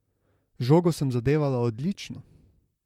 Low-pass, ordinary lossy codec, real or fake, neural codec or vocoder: 19.8 kHz; MP3, 96 kbps; fake; vocoder, 44.1 kHz, 128 mel bands, Pupu-Vocoder